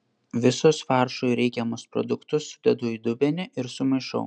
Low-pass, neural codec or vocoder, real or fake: 9.9 kHz; none; real